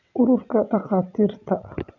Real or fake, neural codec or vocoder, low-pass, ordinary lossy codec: fake; vocoder, 22.05 kHz, 80 mel bands, WaveNeXt; 7.2 kHz; none